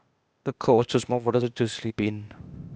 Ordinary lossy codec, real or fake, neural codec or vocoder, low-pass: none; fake; codec, 16 kHz, 0.8 kbps, ZipCodec; none